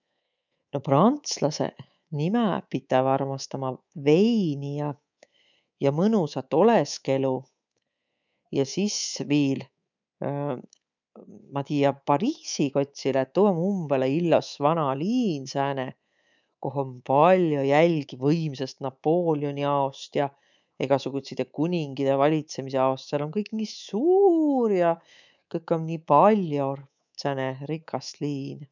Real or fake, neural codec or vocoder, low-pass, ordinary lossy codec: fake; codec, 24 kHz, 3.1 kbps, DualCodec; 7.2 kHz; none